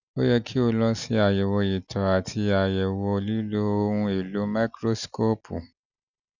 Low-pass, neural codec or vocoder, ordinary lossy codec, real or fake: 7.2 kHz; none; none; real